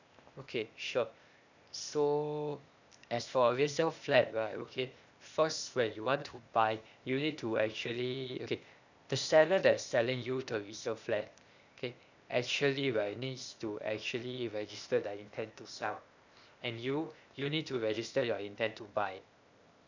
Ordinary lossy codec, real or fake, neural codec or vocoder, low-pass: none; fake; codec, 16 kHz, 0.8 kbps, ZipCodec; 7.2 kHz